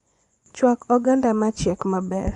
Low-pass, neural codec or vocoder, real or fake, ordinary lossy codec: 10.8 kHz; vocoder, 24 kHz, 100 mel bands, Vocos; fake; Opus, 64 kbps